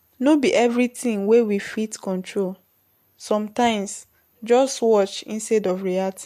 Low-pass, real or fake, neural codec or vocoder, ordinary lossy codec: 14.4 kHz; real; none; MP3, 64 kbps